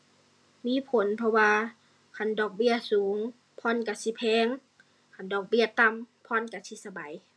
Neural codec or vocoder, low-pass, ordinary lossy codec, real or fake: none; none; none; real